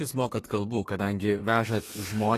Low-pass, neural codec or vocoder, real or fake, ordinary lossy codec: 14.4 kHz; codec, 32 kHz, 1.9 kbps, SNAC; fake; AAC, 48 kbps